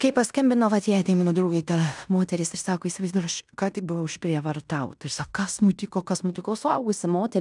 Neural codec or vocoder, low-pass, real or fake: codec, 16 kHz in and 24 kHz out, 0.9 kbps, LongCat-Audio-Codec, fine tuned four codebook decoder; 10.8 kHz; fake